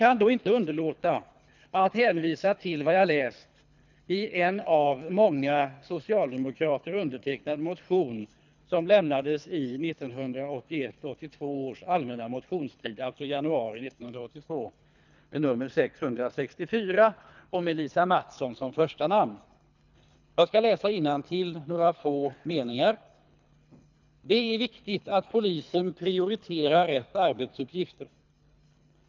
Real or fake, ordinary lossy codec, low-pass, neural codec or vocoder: fake; none; 7.2 kHz; codec, 24 kHz, 3 kbps, HILCodec